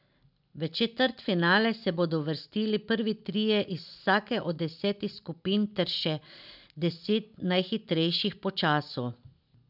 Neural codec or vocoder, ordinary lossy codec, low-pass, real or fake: none; none; 5.4 kHz; real